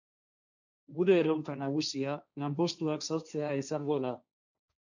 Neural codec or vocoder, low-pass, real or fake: codec, 16 kHz, 1.1 kbps, Voila-Tokenizer; 7.2 kHz; fake